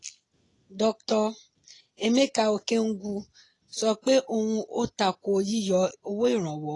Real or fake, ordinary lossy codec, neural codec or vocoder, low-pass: real; AAC, 32 kbps; none; 10.8 kHz